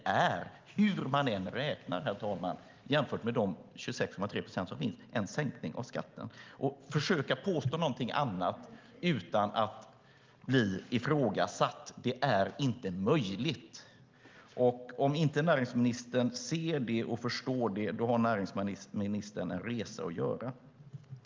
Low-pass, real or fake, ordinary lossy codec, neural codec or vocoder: 7.2 kHz; real; Opus, 32 kbps; none